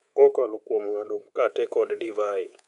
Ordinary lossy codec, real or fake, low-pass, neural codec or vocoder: none; fake; 10.8 kHz; codec, 24 kHz, 3.1 kbps, DualCodec